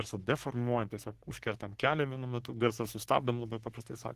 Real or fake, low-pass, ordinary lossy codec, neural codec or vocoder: fake; 14.4 kHz; Opus, 16 kbps; codec, 44.1 kHz, 3.4 kbps, Pupu-Codec